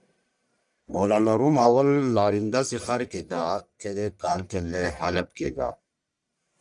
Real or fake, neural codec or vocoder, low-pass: fake; codec, 44.1 kHz, 1.7 kbps, Pupu-Codec; 10.8 kHz